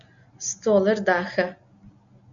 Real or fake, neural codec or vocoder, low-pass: real; none; 7.2 kHz